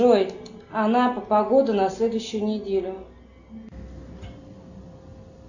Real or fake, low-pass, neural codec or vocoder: real; 7.2 kHz; none